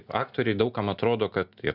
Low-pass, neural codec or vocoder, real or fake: 5.4 kHz; none; real